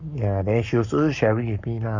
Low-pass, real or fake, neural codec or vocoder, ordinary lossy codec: 7.2 kHz; fake; codec, 44.1 kHz, 7.8 kbps, Pupu-Codec; none